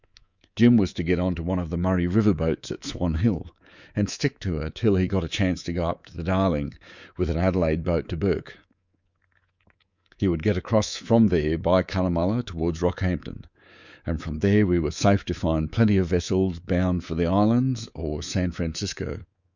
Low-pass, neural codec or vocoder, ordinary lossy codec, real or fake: 7.2 kHz; codec, 24 kHz, 3.1 kbps, DualCodec; Opus, 64 kbps; fake